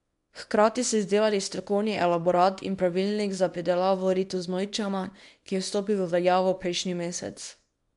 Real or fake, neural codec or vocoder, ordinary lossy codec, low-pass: fake; codec, 24 kHz, 0.9 kbps, WavTokenizer, small release; MP3, 64 kbps; 10.8 kHz